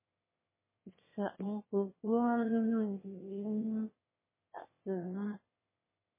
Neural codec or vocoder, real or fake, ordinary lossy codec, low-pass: autoencoder, 22.05 kHz, a latent of 192 numbers a frame, VITS, trained on one speaker; fake; MP3, 16 kbps; 3.6 kHz